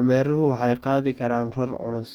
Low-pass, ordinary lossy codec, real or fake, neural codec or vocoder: 19.8 kHz; none; fake; codec, 44.1 kHz, 2.6 kbps, DAC